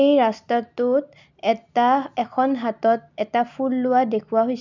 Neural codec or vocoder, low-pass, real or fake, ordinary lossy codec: none; 7.2 kHz; real; none